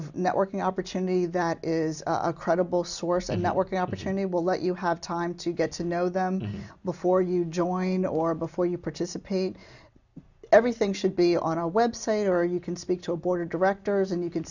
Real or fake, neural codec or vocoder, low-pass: real; none; 7.2 kHz